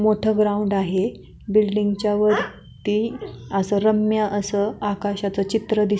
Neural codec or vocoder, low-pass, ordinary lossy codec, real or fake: none; none; none; real